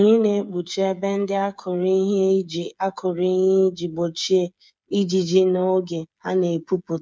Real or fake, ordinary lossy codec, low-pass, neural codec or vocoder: fake; none; none; codec, 16 kHz, 8 kbps, FreqCodec, smaller model